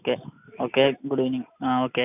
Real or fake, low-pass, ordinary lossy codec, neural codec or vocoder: real; 3.6 kHz; none; none